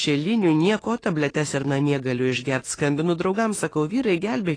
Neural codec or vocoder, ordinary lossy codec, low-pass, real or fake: autoencoder, 48 kHz, 32 numbers a frame, DAC-VAE, trained on Japanese speech; AAC, 32 kbps; 9.9 kHz; fake